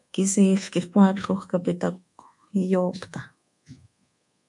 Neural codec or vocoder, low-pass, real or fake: codec, 24 kHz, 1.2 kbps, DualCodec; 10.8 kHz; fake